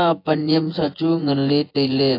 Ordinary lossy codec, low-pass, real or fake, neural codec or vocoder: AAC, 32 kbps; 5.4 kHz; fake; vocoder, 24 kHz, 100 mel bands, Vocos